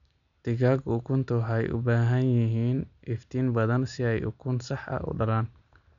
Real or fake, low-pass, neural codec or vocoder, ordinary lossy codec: real; 7.2 kHz; none; none